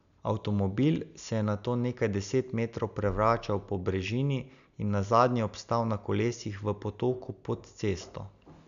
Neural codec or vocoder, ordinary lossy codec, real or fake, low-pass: none; none; real; 7.2 kHz